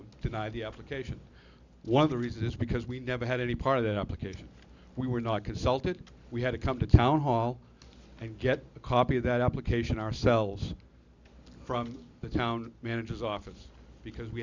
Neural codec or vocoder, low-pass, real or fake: none; 7.2 kHz; real